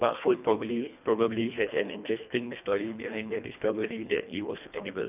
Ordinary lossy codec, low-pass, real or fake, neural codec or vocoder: none; 3.6 kHz; fake; codec, 24 kHz, 1.5 kbps, HILCodec